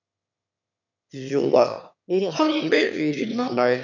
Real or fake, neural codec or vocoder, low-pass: fake; autoencoder, 22.05 kHz, a latent of 192 numbers a frame, VITS, trained on one speaker; 7.2 kHz